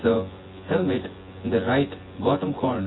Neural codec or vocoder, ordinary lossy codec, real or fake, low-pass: vocoder, 24 kHz, 100 mel bands, Vocos; AAC, 16 kbps; fake; 7.2 kHz